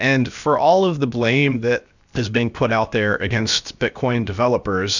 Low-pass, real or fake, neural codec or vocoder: 7.2 kHz; fake; codec, 16 kHz, 0.7 kbps, FocalCodec